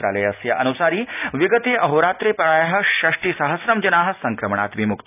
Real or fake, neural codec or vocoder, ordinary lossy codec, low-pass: real; none; none; 3.6 kHz